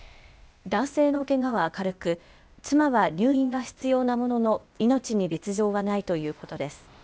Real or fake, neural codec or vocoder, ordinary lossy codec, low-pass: fake; codec, 16 kHz, 0.8 kbps, ZipCodec; none; none